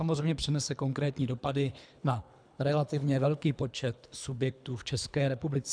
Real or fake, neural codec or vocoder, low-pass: fake; codec, 24 kHz, 3 kbps, HILCodec; 9.9 kHz